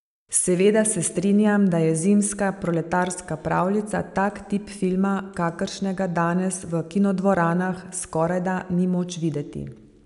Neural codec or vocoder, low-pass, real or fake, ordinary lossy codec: none; 10.8 kHz; real; none